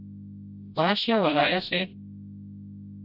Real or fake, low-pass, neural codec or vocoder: fake; 5.4 kHz; codec, 16 kHz, 0.5 kbps, FreqCodec, smaller model